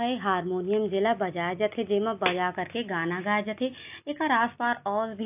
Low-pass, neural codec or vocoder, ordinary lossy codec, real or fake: 3.6 kHz; none; AAC, 32 kbps; real